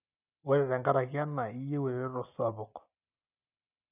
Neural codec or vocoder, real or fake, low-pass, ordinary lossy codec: none; real; 3.6 kHz; none